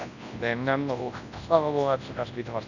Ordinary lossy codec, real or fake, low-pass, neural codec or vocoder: none; fake; 7.2 kHz; codec, 24 kHz, 0.9 kbps, WavTokenizer, large speech release